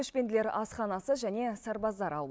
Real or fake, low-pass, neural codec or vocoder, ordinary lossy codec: real; none; none; none